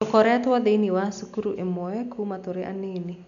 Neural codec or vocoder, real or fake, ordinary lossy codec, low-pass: none; real; none; 7.2 kHz